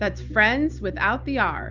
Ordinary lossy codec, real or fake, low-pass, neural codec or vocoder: Opus, 64 kbps; real; 7.2 kHz; none